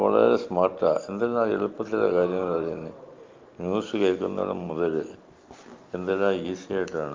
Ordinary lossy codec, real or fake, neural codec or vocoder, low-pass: Opus, 24 kbps; real; none; 7.2 kHz